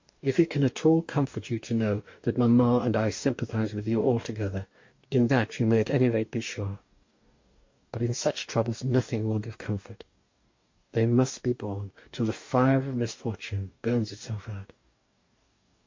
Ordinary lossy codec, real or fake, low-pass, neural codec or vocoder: MP3, 48 kbps; fake; 7.2 kHz; codec, 44.1 kHz, 2.6 kbps, DAC